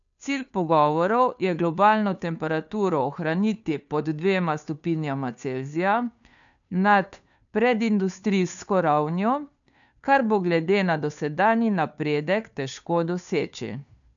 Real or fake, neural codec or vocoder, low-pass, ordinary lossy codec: fake; codec, 16 kHz, 2 kbps, FunCodec, trained on Chinese and English, 25 frames a second; 7.2 kHz; none